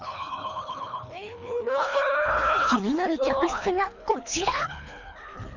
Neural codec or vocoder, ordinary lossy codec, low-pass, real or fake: codec, 24 kHz, 3 kbps, HILCodec; none; 7.2 kHz; fake